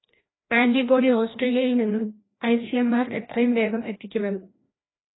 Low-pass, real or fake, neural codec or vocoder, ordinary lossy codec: 7.2 kHz; fake; codec, 16 kHz, 1 kbps, FreqCodec, larger model; AAC, 16 kbps